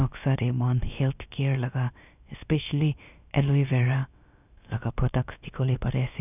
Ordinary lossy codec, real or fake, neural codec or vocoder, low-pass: none; fake; codec, 16 kHz, about 1 kbps, DyCAST, with the encoder's durations; 3.6 kHz